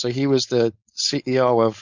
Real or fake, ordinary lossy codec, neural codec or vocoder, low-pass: fake; Opus, 64 kbps; codec, 16 kHz, 4.8 kbps, FACodec; 7.2 kHz